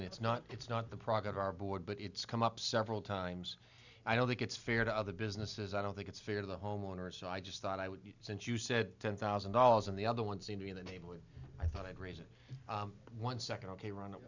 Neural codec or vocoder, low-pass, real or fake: none; 7.2 kHz; real